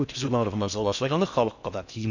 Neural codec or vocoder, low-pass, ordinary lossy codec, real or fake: codec, 16 kHz in and 24 kHz out, 0.6 kbps, FocalCodec, streaming, 2048 codes; 7.2 kHz; none; fake